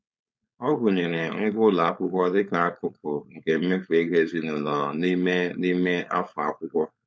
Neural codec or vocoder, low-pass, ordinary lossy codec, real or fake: codec, 16 kHz, 4.8 kbps, FACodec; none; none; fake